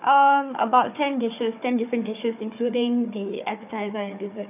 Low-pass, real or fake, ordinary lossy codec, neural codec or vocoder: 3.6 kHz; fake; none; codec, 16 kHz, 4 kbps, FunCodec, trained on Chinese and English, 50 frames a second